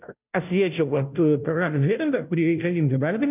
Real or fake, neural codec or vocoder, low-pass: fake; codec, 16 kHz, 0.5 kbps, FunCodec, trained on Chinese and English, 25 frames a second; 3.6 kHz